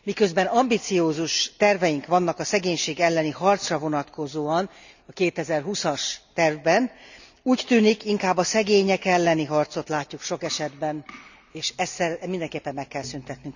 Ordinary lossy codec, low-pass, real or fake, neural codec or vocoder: none; 7.2 kHz; real; none